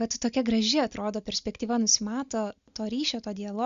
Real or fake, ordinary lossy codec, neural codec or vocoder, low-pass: real; Opus, 64 kbps; none; 7.2 kHz